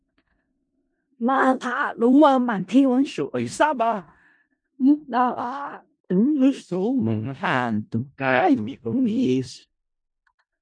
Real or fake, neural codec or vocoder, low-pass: fake; codec, 16 kHz in and 24 kHz out, 0.4 kbps, LongCat-Audio-Codec, four codebook decoder; 9.9 kHz